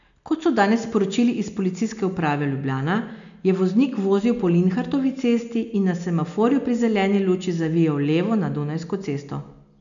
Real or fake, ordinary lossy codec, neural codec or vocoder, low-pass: real; none; none; 7.2 kHz